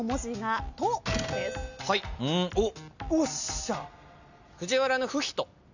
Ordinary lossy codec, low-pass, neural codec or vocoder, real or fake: none; 7.2 kHz; none; real